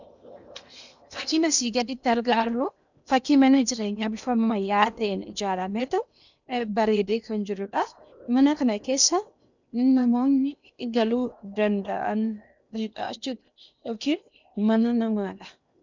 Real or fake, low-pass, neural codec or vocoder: fake; 7.2 kHz; codec, 16 kHz in and 24 kHz out, 0.8 kbps, FocalCodec, streaming, 65536 codes